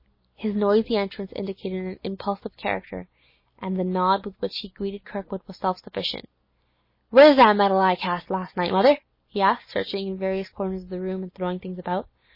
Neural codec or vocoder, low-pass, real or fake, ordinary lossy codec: none; 5.4 kHz; real; MP3, 24 kbps